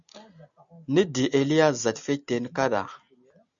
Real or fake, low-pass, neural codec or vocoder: real; 7.2 kHz; none